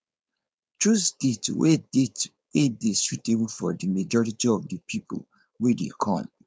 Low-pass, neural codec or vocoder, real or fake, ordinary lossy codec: none; codec, 16 kHz, 4.8 kbps, FACodec; fake; none